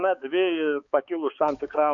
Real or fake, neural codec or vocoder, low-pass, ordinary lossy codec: fake; codec, 16 kHz, 4 kbps, X-Codec, HuBERT features, trained on balanced general audio; 7.2 kHz; AAC, 64 kbps